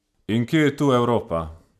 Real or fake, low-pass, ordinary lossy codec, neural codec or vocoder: real; 14.4 kHz; none; none